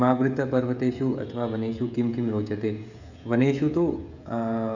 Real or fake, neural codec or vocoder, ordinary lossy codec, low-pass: fake; codec, 16 kHz, 16 kbps, FreqCodec, smaller model; none; 7.2 kHz